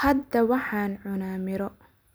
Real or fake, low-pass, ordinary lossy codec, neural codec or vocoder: real; none; none; none